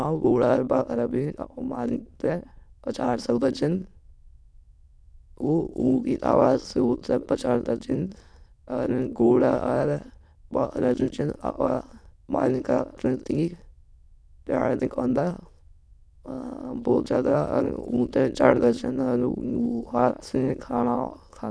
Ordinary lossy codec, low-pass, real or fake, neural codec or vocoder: none; none; fake; autoencoder, 22.05 kHz, a latent of 192 numbers a frame, VITS, trained on many speakers